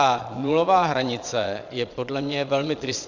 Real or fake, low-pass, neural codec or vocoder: fake; 7.2 kHz; vocoder, 22.05 kHz, 80 mel bands, Vocos